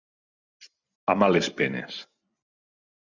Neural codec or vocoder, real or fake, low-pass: vocoder, 44.1 kHz, 128 mel bands every 256 samples, BigVGAN v2; fake; 7.2 kHz